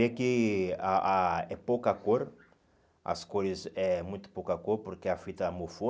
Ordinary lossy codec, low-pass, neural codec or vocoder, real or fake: none; none; none; real